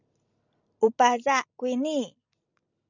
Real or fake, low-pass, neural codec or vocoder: fake; 7.2 kHz; vocoder, 44.1 kHz, 128 mel bands every 256 samples, BigVGAN v2